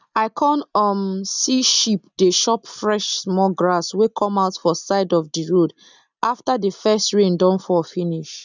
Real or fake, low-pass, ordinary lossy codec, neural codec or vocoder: real; 7.2 kHz; none; none